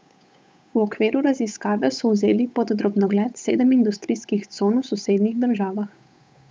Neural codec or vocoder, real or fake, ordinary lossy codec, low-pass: codec, 16 kHz, 8 kbps, FunCodec, trained on Chinese and English, 25 frames a second; fake; none; none